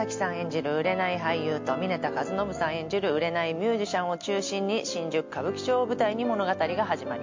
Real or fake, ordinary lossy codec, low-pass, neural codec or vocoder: real; MP3, 48 kbps; 7.2 kHz; none